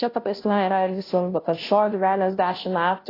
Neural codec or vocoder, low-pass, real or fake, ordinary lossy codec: codec, 16 kHz, 0.5 kbps, FunCodec, trained on Chinese and English, 25 frames a second; 5.4 kHz; fake; AAC, 24 kbps